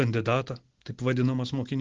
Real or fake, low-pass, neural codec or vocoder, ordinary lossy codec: real; 7.2 kHz; none; Opus, 16 kbps